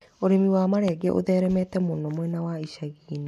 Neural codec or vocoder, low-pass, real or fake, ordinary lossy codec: none; 14.4 kHz; real; none